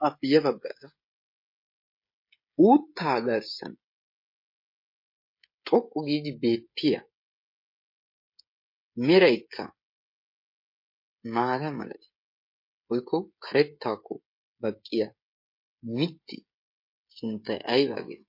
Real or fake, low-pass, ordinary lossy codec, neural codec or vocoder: fake; 5.4 kHz; MP3, 32 kbps; codec, 16 kHz, 16 kbps, FreqCodec, smaller model